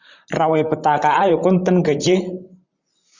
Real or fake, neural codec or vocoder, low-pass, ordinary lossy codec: fake; vocoder, 44.1 kHz, 128 mel bands, Pupu-Vocoder; 7.2 kHz; Opus, 64 kbps